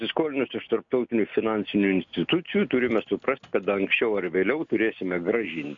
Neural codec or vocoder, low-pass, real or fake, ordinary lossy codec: none; 7.2 kHz; real; MP3, 64 kbps